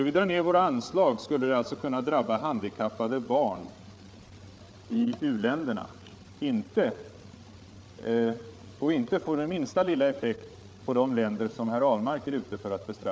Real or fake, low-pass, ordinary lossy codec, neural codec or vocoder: fake; none; none; codec, 16 kHz, 8 kbps, FreqCodec, larger model